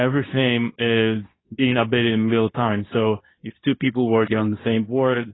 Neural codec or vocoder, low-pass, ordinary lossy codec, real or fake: codec, 24 kHz, 0.9 kbps, WavTokenizer, medium speech release version 2; 7.2 kHz; AAC, 16 kbps; fake